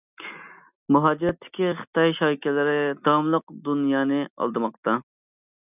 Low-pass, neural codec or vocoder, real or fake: 3.6 kHz; none; real